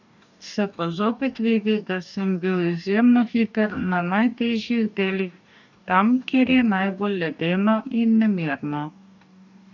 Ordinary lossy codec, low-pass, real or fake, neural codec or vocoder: none; 7.2 kHz; fake; codec, 44.1 kHz, 2.6 kbps, DAC